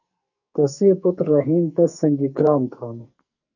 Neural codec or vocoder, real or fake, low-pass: codec, 44.1 kHz, 2.6 kbps, SNAC; fake; 7.2 kHz